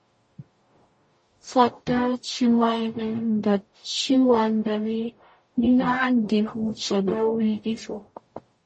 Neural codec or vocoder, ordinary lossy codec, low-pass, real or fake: codec, 44.1 kHz, 0.9 kbps, DAC; MP3, 32 kbps; 10.8 kHz; fake